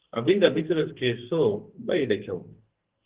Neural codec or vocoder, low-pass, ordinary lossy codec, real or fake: codec, 44.1 kHz, 2.6 kbps, SNAC; 3.6 kHz; Opus, 16 kbps; fake